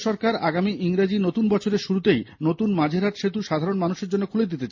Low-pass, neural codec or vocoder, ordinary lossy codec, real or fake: 7.2 kHz; none; MP3, 32 kbps; real